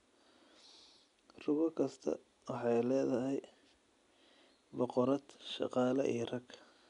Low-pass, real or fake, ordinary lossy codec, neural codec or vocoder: 10.8 kHz; real; none; none